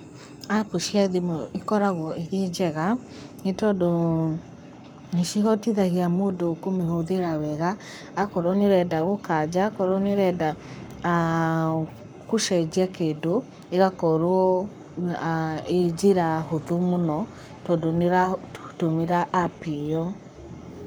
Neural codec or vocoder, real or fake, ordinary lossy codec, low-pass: codec, 44.1 kHz, 7.8 kbps, Pupu-Codec; fake; none; none